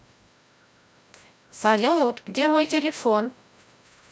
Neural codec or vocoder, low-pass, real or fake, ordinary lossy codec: codec, 16 kHz, 0.5 kbps, FreqCodec, larger model; none; fake; none